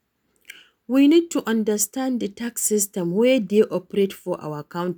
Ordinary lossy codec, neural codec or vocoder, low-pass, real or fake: none; none; none; real